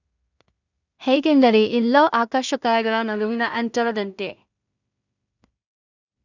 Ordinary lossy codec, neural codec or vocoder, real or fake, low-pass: none; codec, 16 kHz in and 24 kHz out, 0.4 kbps, LongCat-Audio-Codec, two codebook decoder; fake; 7.2 kHz